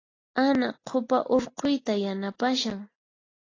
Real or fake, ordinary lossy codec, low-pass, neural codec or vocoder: real; AAC, 32 kbps; 7.2 kHz; none